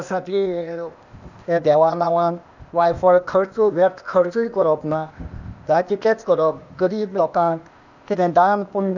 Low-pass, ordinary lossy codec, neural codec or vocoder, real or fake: 7.2 kHz; none; codec, 16 kHz, 0.8 kbps, ZipCodec; fake